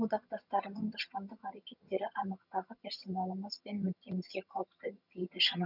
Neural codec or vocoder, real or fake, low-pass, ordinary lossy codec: vocoder, 22.05 kHz, 80 mel bands, HiFi-GAN; fake; 5.4 kHz; none